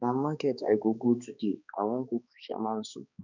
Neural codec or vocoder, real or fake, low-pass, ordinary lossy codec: codec, 16 kHz, 2 kbps, X-Codec, HuBERT features, trained on balanced general audio; fake; 7.2 kHz; none